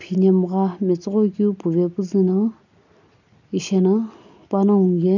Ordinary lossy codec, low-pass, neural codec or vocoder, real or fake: none; 7.2 kHz; none; real